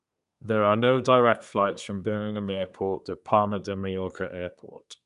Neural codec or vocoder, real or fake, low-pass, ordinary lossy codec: codec, 24 kHz, 1 kbps, SNAC; fake; 10.8 kHz; none